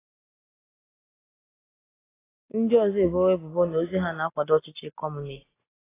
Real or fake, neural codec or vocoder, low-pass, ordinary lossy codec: real; none; 3.6 kHz; AAC, 16 kbps